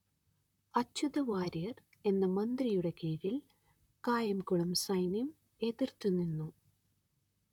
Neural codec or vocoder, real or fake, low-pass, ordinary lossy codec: vocoder, 44.1 kHz, 128 mel bands, Pupu-Vocoder; fake; 19.8 kHz; none